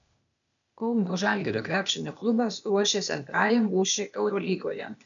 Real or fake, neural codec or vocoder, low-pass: fake; codec, 16 kHz, 0.8 kbps, ZipCodec; 7.2 kHz